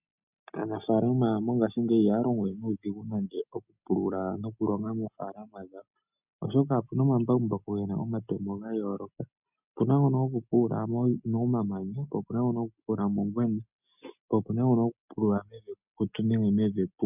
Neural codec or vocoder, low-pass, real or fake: none; 3.6 kHz; real